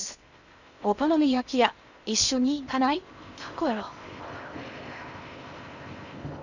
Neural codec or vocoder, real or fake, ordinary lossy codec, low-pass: codec, 16 kHz in and 24 kHz out, 0.6 kbps, FocalCodec, streaming, 2048 codes; fake; none; 7.2 kHz